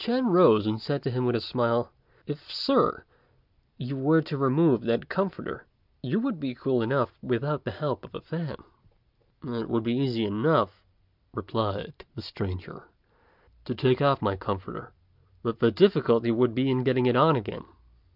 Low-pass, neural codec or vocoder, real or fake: 5.4 kHz; none; real